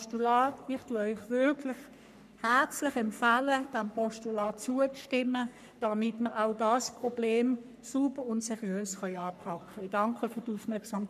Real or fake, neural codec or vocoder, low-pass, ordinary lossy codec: fake; codec, 44.1 kHz, 3.4 kbps, Pupu-Codec; 14.4 kHz; none